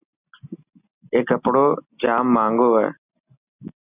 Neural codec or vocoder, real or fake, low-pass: none; real; 3.6 kHz